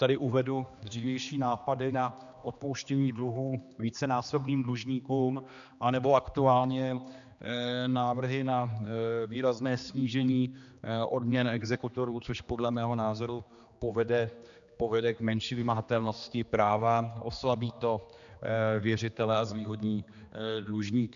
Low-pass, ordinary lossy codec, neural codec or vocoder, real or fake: 7.2 kHz; MP3, 96 kbps; codec, 16 kHz, 2 kbps, X-Codec, HuBERT features, trained on general audio; fake